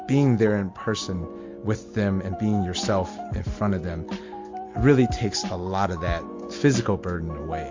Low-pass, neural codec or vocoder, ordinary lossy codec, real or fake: 7.2 kHz; none; MP3, 48 kbps; real